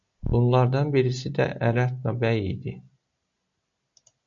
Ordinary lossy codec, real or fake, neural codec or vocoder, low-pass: MP3, 48 kbps; real; none; 7.2 kHz